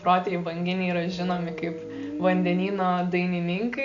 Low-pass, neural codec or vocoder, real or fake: 7.2 kHz; none; real